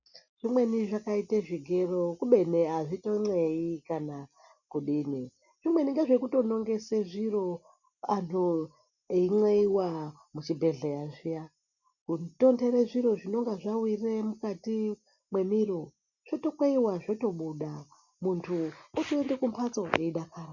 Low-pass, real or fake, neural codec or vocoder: 7.2 kHz; real; none